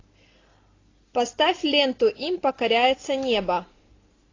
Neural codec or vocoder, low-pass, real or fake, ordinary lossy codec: none; 7.2 kHz; real; AAC, 32 kbps